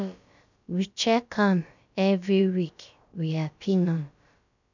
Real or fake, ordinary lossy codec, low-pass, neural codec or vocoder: fake; none; 7.2 kHz; codec, 16 kHz, about 1 kbps, DyCAST, with the encoder's durations